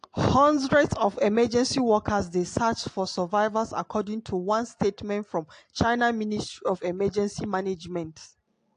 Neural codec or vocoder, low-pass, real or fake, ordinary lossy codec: none; 9.9 kHz; real; AAC, 48 kbps